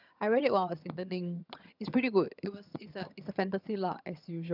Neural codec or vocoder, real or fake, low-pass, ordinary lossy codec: vocoder, 22.05 kHz, 80 mel bands, HiFi-GAN; fake; 5.4 kHz; none